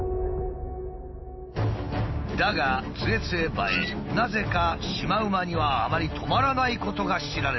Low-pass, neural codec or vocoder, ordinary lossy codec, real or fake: 7.2 kHz; none; MP3, 24 kbps; real